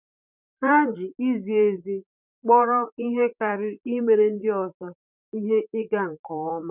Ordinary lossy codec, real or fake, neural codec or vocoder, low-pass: none; fake; vocoder, 44.1 kHz, 128 mel bands, Pupu-Vocoder; 3.6 kHz